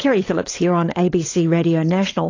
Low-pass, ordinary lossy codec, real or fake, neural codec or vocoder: 7.2 kHz; AAC, 32 kbps; fake; codec, 16 kHz, 16 kbps, FunCodec, trained on LibriTTS, 50 frames a second